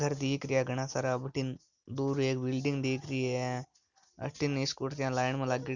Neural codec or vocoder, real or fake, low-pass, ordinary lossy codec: none; real; 7.2 kHz; none